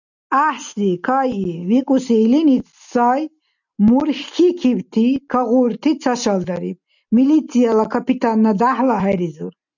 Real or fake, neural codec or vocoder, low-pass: real; none; 7.2 kHz